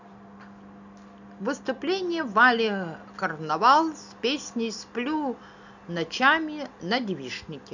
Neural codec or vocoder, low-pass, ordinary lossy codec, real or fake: none; 7.2 kHz; none; real